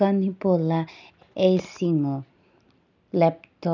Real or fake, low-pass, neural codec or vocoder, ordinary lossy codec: real; 7.2 kHz; none; none